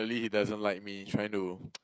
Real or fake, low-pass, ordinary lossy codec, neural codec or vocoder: fake; none; none; codec, 16 kHz, 16 kbps, FreqCodec, smaller model